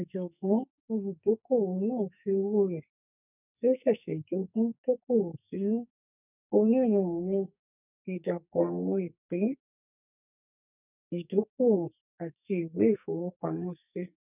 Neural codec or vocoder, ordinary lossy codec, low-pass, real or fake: codec, 44.1 kHz, 2.6 kbps, SNAC; none; 3.6 kHz; fake